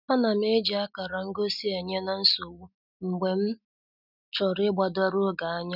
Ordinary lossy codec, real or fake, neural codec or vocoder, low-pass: none; real; none; 5.4 kHz